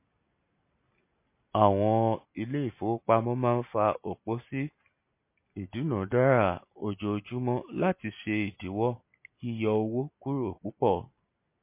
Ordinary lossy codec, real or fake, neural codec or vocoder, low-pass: MP3, 24 kbps; real; none; 3.6 kHz